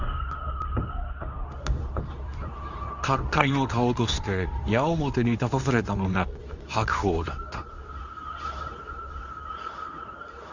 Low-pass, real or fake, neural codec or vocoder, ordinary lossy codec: 7.2 kHz; fake; codec, 24 kHz, 0.9 kbps, WavTokenizer, medium speech release version 2; none